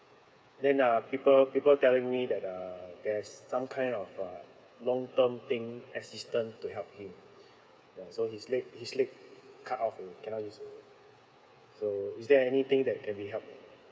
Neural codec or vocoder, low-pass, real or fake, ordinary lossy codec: codec, 16 kHz, 8 kbps, FreqCodec, smaller model; none; fake; none